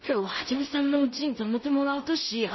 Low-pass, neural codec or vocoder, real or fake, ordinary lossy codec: 7.2 kHz; codec, 16 kHz in and 24 kHz out, 0.4 kbps, LongCat-Audio-Codec, two codebook decoder; fake; MP3, 24 kbps